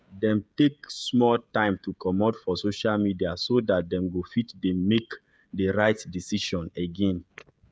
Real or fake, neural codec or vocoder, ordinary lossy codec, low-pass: fake; codec, 16 kHz, 16 kbps, FreqCodec, smaller model; none; none